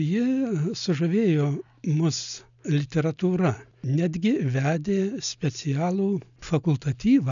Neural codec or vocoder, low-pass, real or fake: none; 7.2 kHz; real